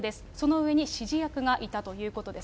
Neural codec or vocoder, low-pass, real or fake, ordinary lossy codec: none; none; real; none